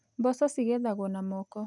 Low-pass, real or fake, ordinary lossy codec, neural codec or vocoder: 10.8 kHz; real; none; none